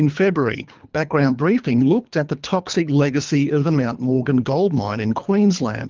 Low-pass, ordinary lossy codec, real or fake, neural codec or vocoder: 7.2 kHz; Opus, 24 kbps; fake; codec, 24 kHz, 3 kbps, HILCodec